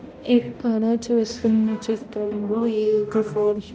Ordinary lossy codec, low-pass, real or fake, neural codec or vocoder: none; none; fake; codec, 16 kHz, 0.5 kbps, X-Codec, HuBERT features, trained on balanced general audio